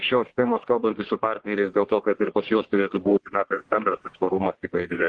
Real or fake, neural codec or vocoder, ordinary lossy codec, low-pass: fake; codec, 44.1 kHz, 1.7 kbps, Pupu-Codec; Opus, 16 kbps; 9.9 kHz